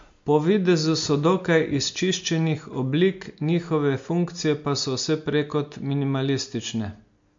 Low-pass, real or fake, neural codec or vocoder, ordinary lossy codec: 7.2 kHz; real; none; MP3, 48 kbps